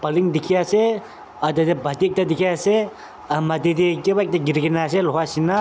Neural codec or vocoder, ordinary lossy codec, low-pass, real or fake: none; none; none; real